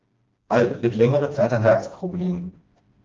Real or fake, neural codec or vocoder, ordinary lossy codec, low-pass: fake; codec, 16 kHz, 1 kbps, FreqCodec, smaller model; Opus, 24 kbps; 7.2 kHz